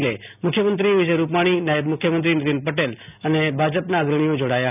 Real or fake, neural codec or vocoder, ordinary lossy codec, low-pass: real; none; none; 3.6 kHz